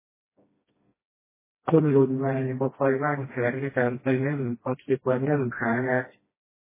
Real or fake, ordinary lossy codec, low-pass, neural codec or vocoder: fake; MP3, 16 kbps; 3.6 kHz; codec, 16 kHz, 1 kbps, FreqCodec, smaller model